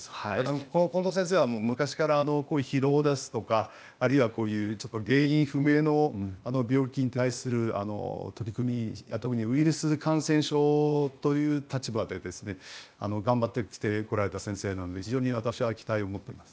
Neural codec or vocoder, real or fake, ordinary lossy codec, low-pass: codec, 16 kHz, 0.8 kbps, ZipCodec; fake; none; none